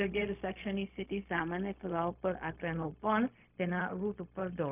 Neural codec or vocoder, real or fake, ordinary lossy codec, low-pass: codec, 16 kHz, 0.4 kbps, LongCat-Audio-Codec; fake; none; 3.6 kHz